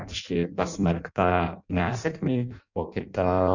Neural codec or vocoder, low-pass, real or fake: codec, 16 kHz in and 24 kHz out, 0.6 kbps, FireRedTTS-2 codec; 7.2 kHz; fake